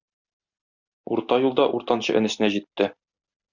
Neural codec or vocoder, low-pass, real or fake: none; 7.2 kHz; real